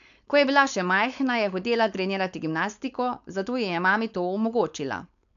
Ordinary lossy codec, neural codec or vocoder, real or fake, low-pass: none; codec, 16 kHz, 4.8 kbps, FACodec; fake; 7.2 kHz